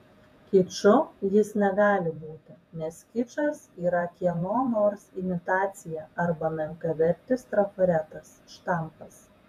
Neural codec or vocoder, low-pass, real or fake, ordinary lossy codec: vocoder, 48 kHz, 128 mel bands, Vocos; 14.4 kHz; fake; MP3, 96 kbps